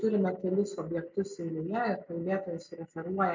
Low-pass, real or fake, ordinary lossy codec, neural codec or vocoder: 7.2 kHz; real; MP3, 64 kbps; none